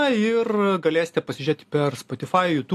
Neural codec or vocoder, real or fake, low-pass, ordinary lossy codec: none; real; 14.4 kHz; AAC, 64 kbps